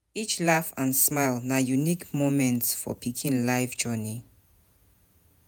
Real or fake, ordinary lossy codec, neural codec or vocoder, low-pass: fake; none; vocoder, 48 kHz, 128 mel bands, Vocos; none